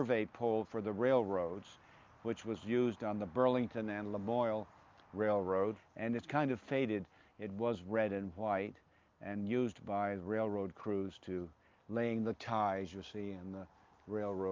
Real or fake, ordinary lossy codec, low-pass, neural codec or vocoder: real; Opus, 24 kbps; 7.2 kHz; none